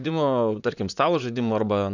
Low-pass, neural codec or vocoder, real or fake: 7.2 kHz; vocoder, 44.1 kHz, 128 mel bands, Pupu-Vocoder; fake